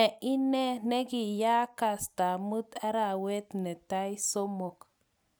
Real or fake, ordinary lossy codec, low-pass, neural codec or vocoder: real; none; none; none